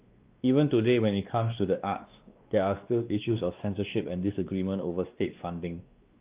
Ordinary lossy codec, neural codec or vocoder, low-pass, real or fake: Opus, 32 kbps; codec, 16 kHz, 2 kbps, X-Codec, WavLM features, trained on Multilingual LibriSpeech; 3.6 kHz; fake